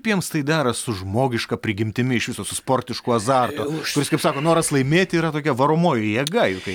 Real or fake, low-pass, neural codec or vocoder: real; 19.8 kHz; none